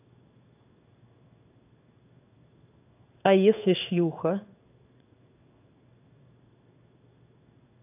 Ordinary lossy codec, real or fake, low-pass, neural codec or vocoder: none; fake; 3.6 kHz; codec, 16 kHz in and 24 kHz out, 1 kbps, XY-Tokenizer